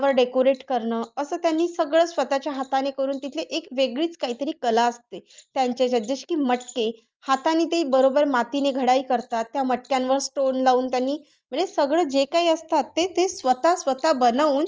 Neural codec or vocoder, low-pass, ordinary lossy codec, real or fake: none; 7.2 kHz; Opus, 24 kbps; real